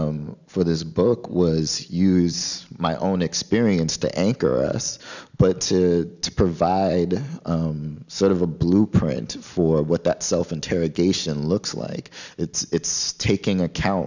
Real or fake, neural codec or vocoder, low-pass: real; none; 7.2 kHz